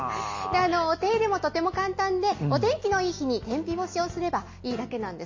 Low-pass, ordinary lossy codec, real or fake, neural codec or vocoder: 7.2 kHz; MP3, 32 kbps; real; none